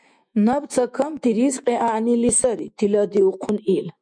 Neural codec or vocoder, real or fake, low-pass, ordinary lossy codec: autoencoder, 48 kHz, 128 numbers a frame, DAC-VAE, trained on Japanese speech; fake; 9.9 kHz; MP3, 96 kbps